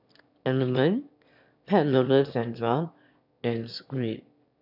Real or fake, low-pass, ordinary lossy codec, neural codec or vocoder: fake; 5.4 kHz; none; autoencoder, 22.05 kHz, a latent of 192 numbers a frame, VITS, trained on one speaker